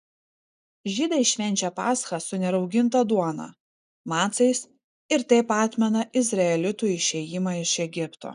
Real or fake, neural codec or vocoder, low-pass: real; none; 10.8 kHz